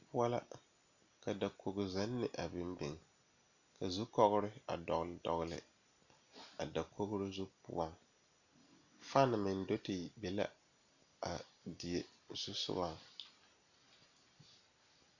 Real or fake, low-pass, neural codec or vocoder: real; 7.2 kHz; none